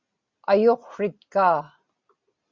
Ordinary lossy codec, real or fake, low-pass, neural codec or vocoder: Opus, 64 kbps; real; 7.2 kHz; none